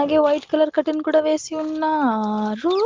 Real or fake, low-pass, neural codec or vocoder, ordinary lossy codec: real; 7.2 kHz; none; Opus, 16 kbps